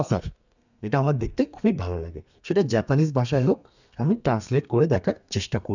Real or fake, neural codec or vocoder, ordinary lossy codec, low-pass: fake; codec, 32 kHz, 1.9 kbps, SNAC; none; 7.2 kHz